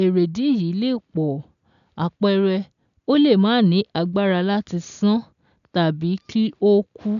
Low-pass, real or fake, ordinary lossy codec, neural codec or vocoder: 7.2 kHz; real; none; none